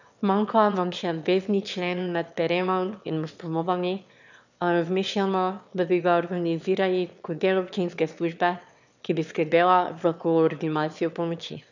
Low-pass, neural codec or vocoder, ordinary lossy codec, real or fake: 7.2 kHz; autoencoder, 22.05 kHz, a latent of 192 numbers a frame, VITS, trained on one speaker; none; fake